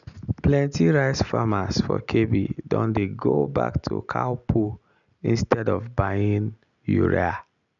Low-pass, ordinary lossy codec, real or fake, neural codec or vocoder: 7.2 kHz; none; real; none